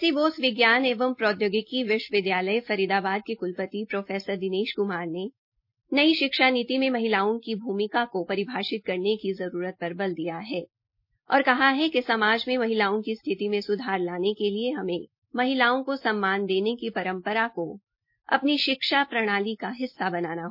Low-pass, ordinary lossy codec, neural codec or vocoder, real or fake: 5.4 kHz; MP3, 32 kbps; none; real